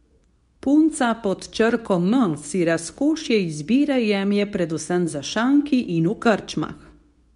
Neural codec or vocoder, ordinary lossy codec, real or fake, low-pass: codec, 24 kHz, 0.9 kbps, WavTokenizer, medium speech release version 2; MP3, 96 kbps; fake; 10.8 kHz